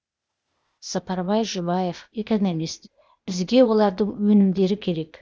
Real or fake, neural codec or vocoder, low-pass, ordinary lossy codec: fake; codec, 16 kHz, 0.8 kbps, ZipCodec; none; none